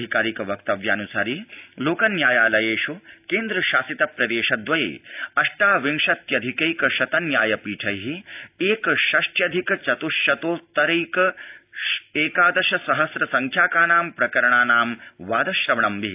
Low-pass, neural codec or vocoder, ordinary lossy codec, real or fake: 3.6 kHz; none; AAC, 32 kbps; real